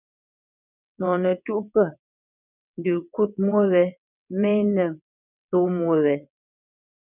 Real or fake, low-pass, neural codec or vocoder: fake; 3.6 kHz; vocoder, 22.05 kHz, 80 mel bands, WaveNeXt